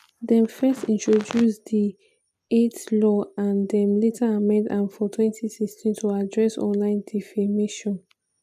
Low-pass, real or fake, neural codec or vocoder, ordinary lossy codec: 14.4 kHz; real; none; none